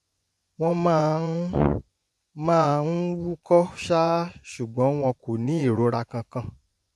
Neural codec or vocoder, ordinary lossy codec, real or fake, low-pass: vocoder, 24 kHz, 100 mel bands, Vocos; none; fake; none